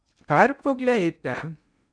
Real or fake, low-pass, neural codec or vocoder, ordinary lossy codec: fake; 9.9 kHz; codec, 16 kHz in and 24 kHz out, 0.6 kbps, FocalCodec, streaming, 2048 codes; none